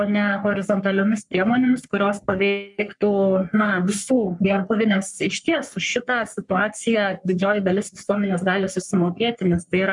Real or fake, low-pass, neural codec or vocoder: fake; 10.8 kHz; codec, 44.1 kHz, 3.4 kbps, Pupu-Codec